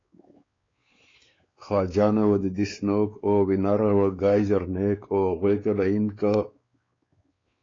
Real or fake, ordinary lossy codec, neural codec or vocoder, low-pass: fake; AAC, 32 kbps; codec, 16 kHz, 4 kbps, X-Codec, WavLM features, trained on Multilingual LibriSpeech; 7.2 kHz